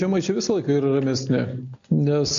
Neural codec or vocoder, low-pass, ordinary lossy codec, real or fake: none; 7.2 kHz; AAC, 64 kbps; real